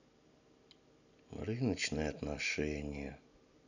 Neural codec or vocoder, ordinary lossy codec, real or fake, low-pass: none; none; real; 7.2 kHz